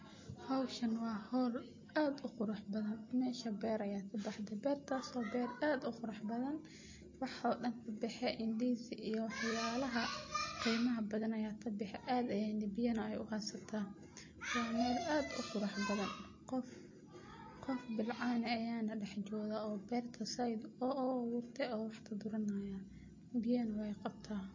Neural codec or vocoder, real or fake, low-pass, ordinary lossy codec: none; real; 7.2 kHz; MP3, 32 kbps